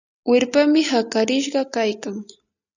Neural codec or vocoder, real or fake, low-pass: none; real; 7.2 kHz